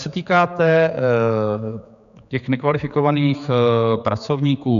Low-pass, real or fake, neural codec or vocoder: 7.2 kHz; fake; codec, 16 kHz, 4 kbps, X-Codec, HuBERT features, trained on general audio